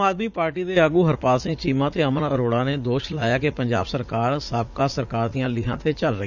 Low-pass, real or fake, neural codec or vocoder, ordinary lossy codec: 7.2 kHz; fake; vocoder, 44.1 kHz, 80 mel bands, Vocos; none